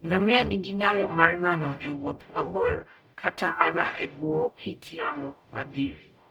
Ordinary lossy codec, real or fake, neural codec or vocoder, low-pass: none; fake; codec, 44.1 kHz, 0.9 kbps, DAC; 19.8 kHz